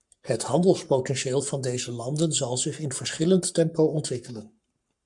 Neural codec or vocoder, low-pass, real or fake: codec, 44.1 kHz, 7.8 kbps, Pupu-Codec; 10.8 kHz; fake